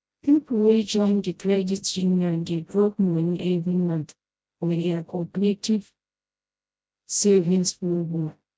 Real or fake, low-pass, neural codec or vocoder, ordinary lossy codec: fake; none; codec, 16 kHz, 0.5 kbps, FreqCodec, smaller model; none